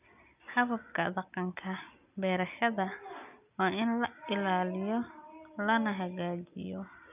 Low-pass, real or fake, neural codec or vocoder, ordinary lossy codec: 3.6 kHz; real; none; none